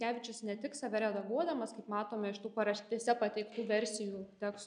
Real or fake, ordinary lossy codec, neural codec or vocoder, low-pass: real; MP3, 96 kbps; none; 9.9 kHz